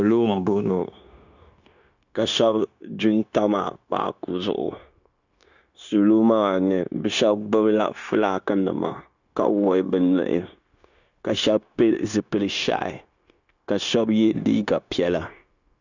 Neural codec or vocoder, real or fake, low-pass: codec, 16 kHz, 0.9 kbps, LongCat-Audio-Codec; fake; 7.2 kHz